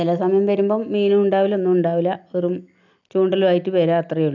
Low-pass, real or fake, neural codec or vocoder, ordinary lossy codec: 7.2 kHz; real; none; none